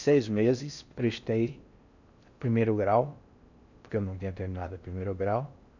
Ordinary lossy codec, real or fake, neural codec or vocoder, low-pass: none; fake; codec, 16 kHz in and 24 kHz out, 0.6 kbps, FocalCodec, streaming, 4096 codes; 7.2 kHz